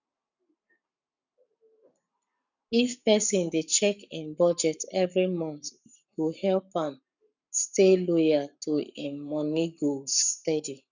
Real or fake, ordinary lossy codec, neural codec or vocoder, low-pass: fake; none; codec, 44.1 kHz, 7.8 kbps, Pupu-Codec; 7.2 kHz